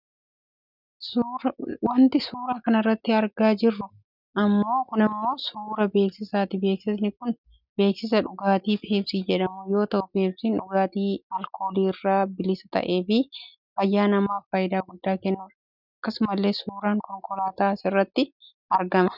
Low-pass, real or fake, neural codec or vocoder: 5.4 kHz; real; none